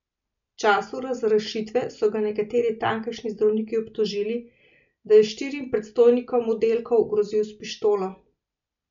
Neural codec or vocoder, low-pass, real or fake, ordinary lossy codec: none; 7.2 kHz; real; MP3, 64 kbps